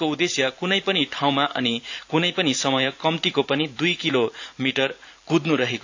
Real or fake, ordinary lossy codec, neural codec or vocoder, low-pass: fake; AAC, 48 kbps; vocoder, 44.1 kHz, 128 mel bands every 512 samples, BigVGAN v2; 7.2 kHz